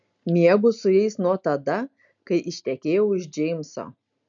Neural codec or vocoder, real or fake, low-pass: none; real; 7.2 kHz